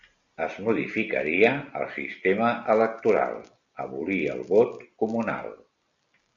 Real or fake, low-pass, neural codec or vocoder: real; 7.2 kHz; none